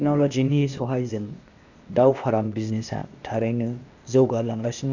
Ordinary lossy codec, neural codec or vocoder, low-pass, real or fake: none; codec, 16 kHz, 0.8 kbps, ZipCodec; 7.2 kHz; fake